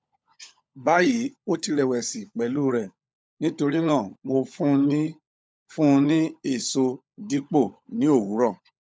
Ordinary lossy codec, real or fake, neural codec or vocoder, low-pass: none; fake; codec, 16 kHz, 16 kbps, FunCodec, trained on LibriTTS, 50 frames a second; none